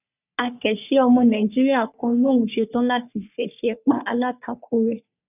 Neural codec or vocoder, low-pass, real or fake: codec, 44.1 kHz, 3.4 kbps, Pupu-Codec; 3.6 kHz; fake